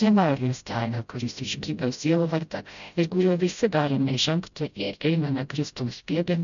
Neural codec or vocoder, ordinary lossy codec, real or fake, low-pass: codec, 16 kHz, 0.5 kbps, FreqCodec, smaller model; MP3, 96 kbps; fake; 7.2 kHz